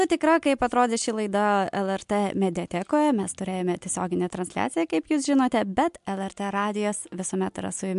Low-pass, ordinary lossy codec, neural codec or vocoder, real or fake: 10.8 kHz; MP3, 64 kbps; none; real